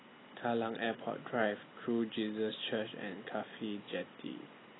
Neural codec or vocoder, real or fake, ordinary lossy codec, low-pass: none; real; AAC, 16 kbps; 7.2 kHz